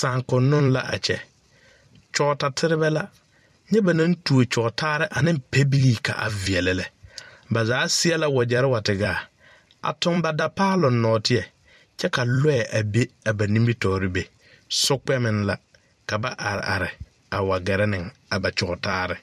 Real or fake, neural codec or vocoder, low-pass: fake; vocoder, 44.1 kHz, 128 mel bands every 256 samples, BigVGAN v2; 14.4 kHz